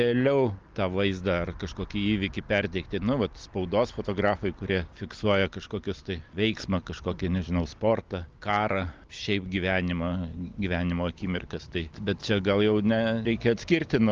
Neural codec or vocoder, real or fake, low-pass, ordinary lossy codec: none; real; 7.2 kHz; Opus, 32 kbps